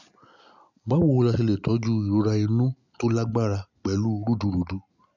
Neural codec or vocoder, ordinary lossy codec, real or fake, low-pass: none; none; real; 7.2 kHz